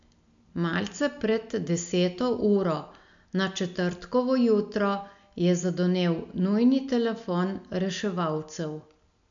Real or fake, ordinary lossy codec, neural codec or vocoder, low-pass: real; MP3, 96 kbps; none; 7.2 kHz